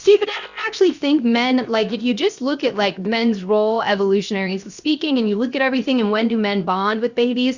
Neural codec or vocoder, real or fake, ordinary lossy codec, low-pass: codec, 16 kHz, 0.7 kbps, FocalCodec; fake; Opus, 64 kbps; 7.2 kHz